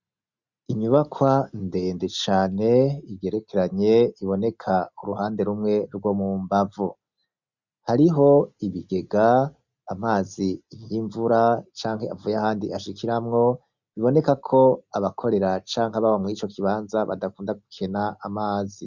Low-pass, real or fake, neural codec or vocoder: 7.2 kHz; real; none